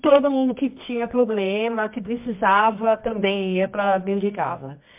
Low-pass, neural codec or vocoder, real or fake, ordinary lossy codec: 3.6 kHz; codec, 24 kHz, 0.9 kbps, WavTokenizer, medium music audio release; fake; MP3, 32 kbps